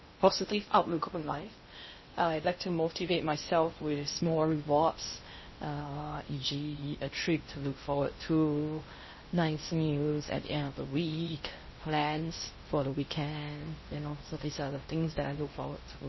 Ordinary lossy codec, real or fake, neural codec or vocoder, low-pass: MP3, 24 kbps; fake; codec, 16 kHz in and 24 kHz out, 0.6 kbps, FocalCodec, streaming, 2048 codes; 7.2 kHz